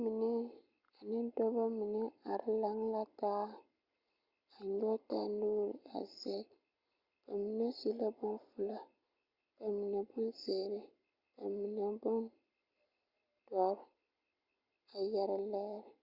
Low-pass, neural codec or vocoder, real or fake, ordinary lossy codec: 5.4 kHz; none; real; Opus, 64 kbps